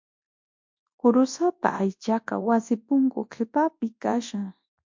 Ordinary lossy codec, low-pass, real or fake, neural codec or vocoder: MP3, 48 kbps; 7.2 kHz; fake; codec, 24 kHz, 0.9 kbps, WavTokenizer, large speech release